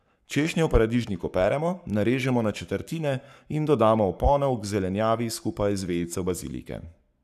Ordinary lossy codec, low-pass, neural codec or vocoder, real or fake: none; 14.4 kHz; codec, 44.1 kHz, 7.8 kbps, Pupu-Codec; fake